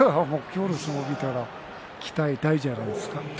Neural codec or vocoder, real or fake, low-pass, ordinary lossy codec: none; real; none; none